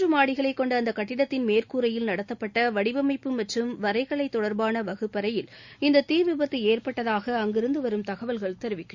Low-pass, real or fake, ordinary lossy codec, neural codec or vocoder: 7.2 kHz; real; Opus, 64 kbps; none